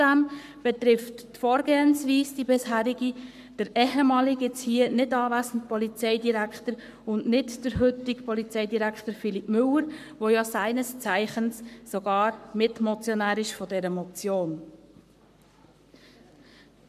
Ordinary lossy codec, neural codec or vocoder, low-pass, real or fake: none; codec, 44.1 kHz, 7.8 kbps, Pupu-Codec; 14.4 kHz; fake